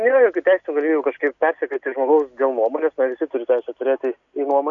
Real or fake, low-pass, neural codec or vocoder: real; 7.2 kHz; none